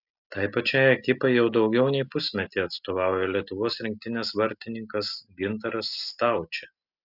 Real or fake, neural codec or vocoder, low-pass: real; none; 5.4 kHz